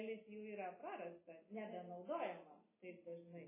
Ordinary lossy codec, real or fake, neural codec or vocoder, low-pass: MP3, 16 kbps; real; none; 3.6 kHz